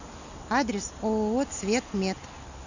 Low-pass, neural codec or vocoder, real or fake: 7.2 kHz; none; real